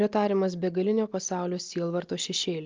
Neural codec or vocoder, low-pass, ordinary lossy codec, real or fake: none; 7.2 kHz; Opus, 32 kbps; real